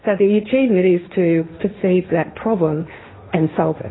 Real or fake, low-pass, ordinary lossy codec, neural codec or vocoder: fake; 7.2 kHz; AAC, 16 kbps; codec, 16 kHz, 1.1 kbps, Voila-Tokenizer